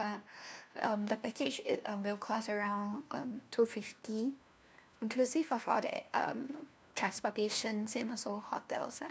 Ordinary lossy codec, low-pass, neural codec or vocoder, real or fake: none; none; codec, 16 kHz, 1 kbps, FunCodec, trained on LibriTTS, 50 frames a second; fake